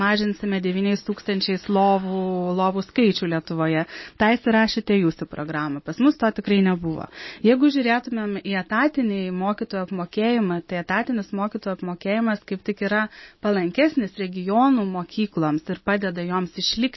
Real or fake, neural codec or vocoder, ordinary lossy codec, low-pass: real; none; MP3, 24 kbps; 7.2 kHz